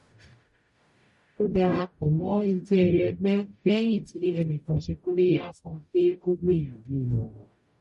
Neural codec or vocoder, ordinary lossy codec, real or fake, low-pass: codec, 44.1 kHz, 0.9 kbps, DAC; MP3, 48 kbps; fake; 14.4 kHz